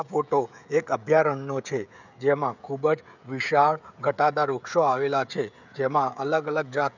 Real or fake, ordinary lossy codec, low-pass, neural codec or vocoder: fake; none; 7.2 kHz; codec, 16 kHz, 16 kbps, FreqCodec, smaller model